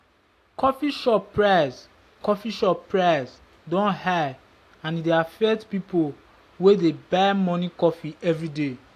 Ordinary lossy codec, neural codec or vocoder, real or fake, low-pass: AAC, 64 kbps; none; real; 14.4 kHz